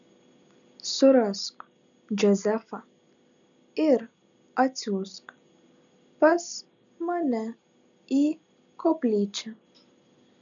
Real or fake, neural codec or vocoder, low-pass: real; none; 7.2 kHz